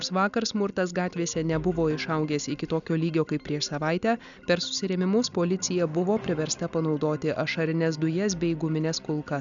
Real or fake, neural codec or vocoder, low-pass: real; none; 7.2 kHz